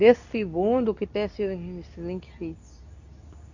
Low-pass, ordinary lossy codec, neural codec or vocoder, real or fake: 7.2 kHz; none; codec, 24 kHz, 0.9 kbps, WavTokenizer, medium speech release version 2; fake